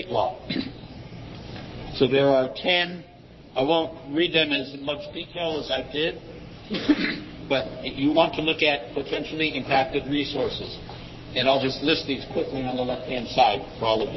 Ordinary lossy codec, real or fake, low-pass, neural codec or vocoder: MP3, 24 kbps; fake; 7.2 kHz; codec, 44.1 kHz, 3.4 kbps, Pupu-Codec